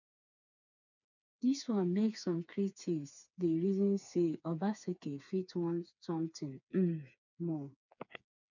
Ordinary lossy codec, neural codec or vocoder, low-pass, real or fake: none; codec, 16 kHz, 4 kbps, FreqCodec, smaller model; 7.2 kHz; fake